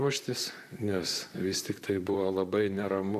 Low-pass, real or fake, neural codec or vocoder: 14.4 kHz; fake; vocoder, 44.1 kHz, 128 mel bands, Pupu-Vocoder